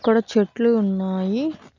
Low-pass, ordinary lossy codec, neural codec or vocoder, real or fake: 7.2 kHz; none; none; real